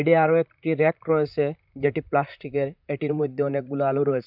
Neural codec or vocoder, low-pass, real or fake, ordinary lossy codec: vocoder, 44.1 kHz, 128 mel bands, Pupu-Vocoder; 5.4 kHz; fake; none